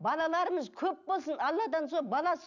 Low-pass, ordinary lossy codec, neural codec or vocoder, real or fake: 7.2 kHz; none; none; real